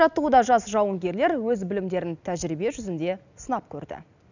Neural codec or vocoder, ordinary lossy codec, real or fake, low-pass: none; none; real; 7.2 kHz